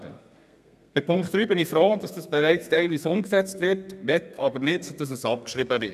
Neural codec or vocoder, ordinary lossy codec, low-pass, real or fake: codec, 32 kHz, 1.9 kbps, SNAC; none; 14.4 kHz; fake